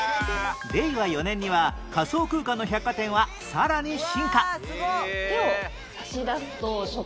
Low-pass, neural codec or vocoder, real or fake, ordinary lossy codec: none; none; real; none